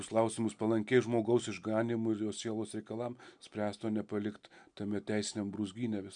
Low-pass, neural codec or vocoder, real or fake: 9.9 kHz; none; real